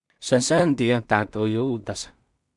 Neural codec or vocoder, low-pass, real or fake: codec, 16 kHz in and 24 kHz out, 0.4 kbps, LongCat-Audio-Codec, two codebook decoder; 10.8 kHz; fake